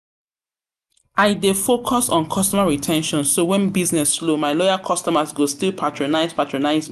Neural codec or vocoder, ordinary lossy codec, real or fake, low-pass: none; none; real; 14.4 kHz